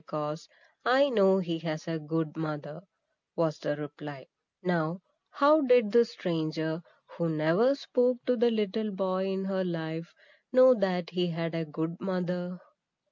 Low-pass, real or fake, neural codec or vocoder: 7.2 kHz; real; none